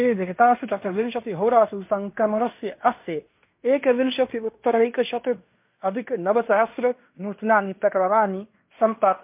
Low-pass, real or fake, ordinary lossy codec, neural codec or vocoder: 3.6 kHz; fake; MP3, 32 kbps; codec, 16 kHz in and 24 kHz out, 0.9 kbps, LongCat-Audio-Codec, fine tuned four codebook decoder